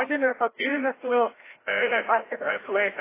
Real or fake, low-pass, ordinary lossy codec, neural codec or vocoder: fake; 3.6 kHz; AAC, 16 kbps; codec, 16 kHz, 0.5 kbps, FreqCodec, larger model